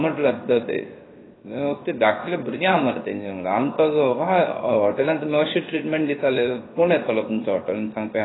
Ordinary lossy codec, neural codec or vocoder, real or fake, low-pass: AAC, 16 kbps; codec, 16 kHz, 0.7 kbps, FocalCodec; fake; 7.2 kHz